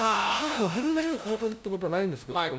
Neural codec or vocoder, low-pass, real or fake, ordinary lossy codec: codec, 16 kHz, 0.5 kbps, FunCodec, trained on LibriTTS, 25 frames a second; none; fake; none